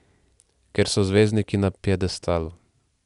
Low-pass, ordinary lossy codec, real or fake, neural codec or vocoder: 10.8 kHz; none; real; none